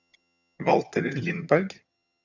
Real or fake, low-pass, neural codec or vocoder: fake; 7.2 kHz; vocoder, 22.05 kHz, 80 mel bands, HiFi-GAN